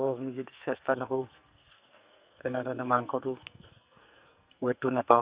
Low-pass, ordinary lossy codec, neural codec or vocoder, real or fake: 3.6 kHz; Opus, 64 kbps; codec, 44.1 kHz, 2.6 kbps, SNAC; fake